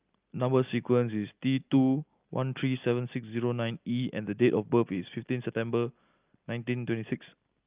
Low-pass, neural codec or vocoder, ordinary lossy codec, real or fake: 3.6 kHz; none; Opus, 24 kbps; real